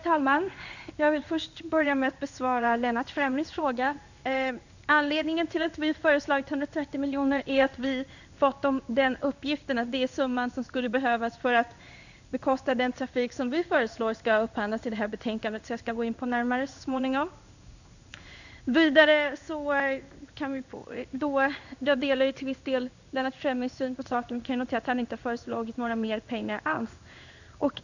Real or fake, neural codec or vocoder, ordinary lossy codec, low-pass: fake; codec, 16 kHz in and 24 kHz out, 1 kbps, XY-Tokenizer; none; 7.2 kHz